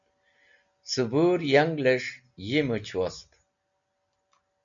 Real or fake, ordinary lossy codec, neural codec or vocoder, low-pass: real; MP3, 96 kbps; none; 7.2 kHz